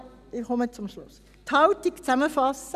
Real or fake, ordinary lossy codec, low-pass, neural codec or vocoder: real; none; 14.4 kHz; none